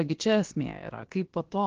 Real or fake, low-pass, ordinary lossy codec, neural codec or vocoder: fake; 7.2 kHz; Opus, 16 kbps; codec, 16 kHz, about 1 kbps, DyCAST, with the encoder's durations